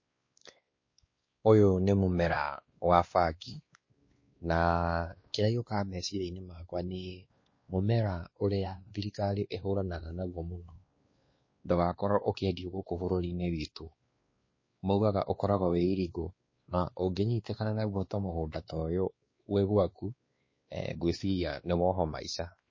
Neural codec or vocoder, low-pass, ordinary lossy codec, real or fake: codec, 16 kHz, 2 kbps, X-Codec, WavLM features, trained on Multilingual LibriSpeech; 7.2 kHz; MP3, 32 kbps; fake